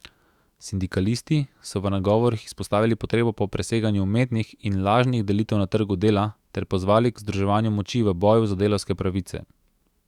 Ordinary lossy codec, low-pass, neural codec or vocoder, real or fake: Opus, 64 kbps; 19.8 kHz; autoencoder, 48 kHz, 128 numbers a frame, DAC-VAE, trained on Japanese speech; fake